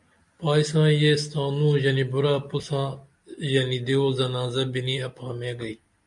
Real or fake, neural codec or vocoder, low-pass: real; none; 10.8 kHz